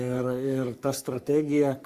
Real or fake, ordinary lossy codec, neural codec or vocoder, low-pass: fake; Opus, 64 kbps; codec, 44.1 kHz, 3.4 kbps, Pupu-Codec; 14.4 kHz